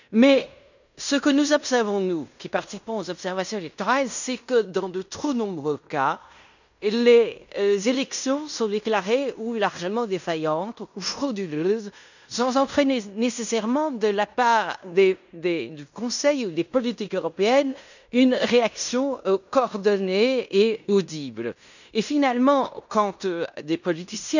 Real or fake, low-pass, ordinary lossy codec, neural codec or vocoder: fake; 7.2 kHz; none; codec, 16 kHz in and 24 kHz out, 0.9 kbps, LongCat-Audio-Codec, fine tuned four codebook decoder